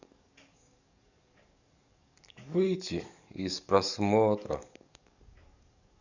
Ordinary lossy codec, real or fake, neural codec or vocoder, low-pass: none; fake; codec, 44.1 kHz, 7.8 kbps, DAC; 7.2 kHz